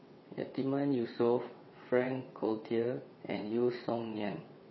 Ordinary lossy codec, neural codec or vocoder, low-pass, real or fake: MP3, 24 kbps; vocoder, 22.05 kHz, 80 mel bands, WaveNeXt; 7.2 kHz; fake